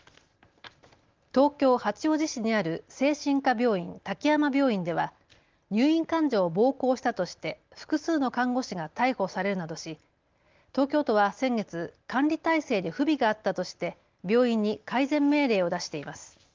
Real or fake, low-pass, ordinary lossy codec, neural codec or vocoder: real; 7.2 kHz; Opus, 32 kbps; none